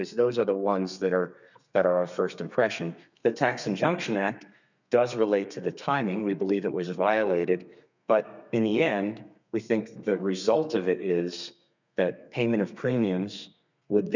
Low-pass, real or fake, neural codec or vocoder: 7.2 kHz; fake; codec, 32 kHz, 1.9 kbps, SNAC